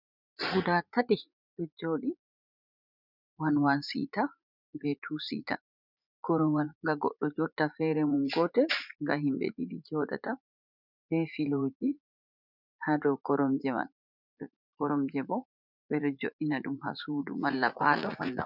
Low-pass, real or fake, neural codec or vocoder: 5.4 kHz; fake; vocoder, 44.1 kHz, 80 mel bands, Vocos